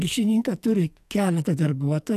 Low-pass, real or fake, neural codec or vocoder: 14.4 kHz; fake; codec, 44.1 kHz, 2.6 kbps, SNAC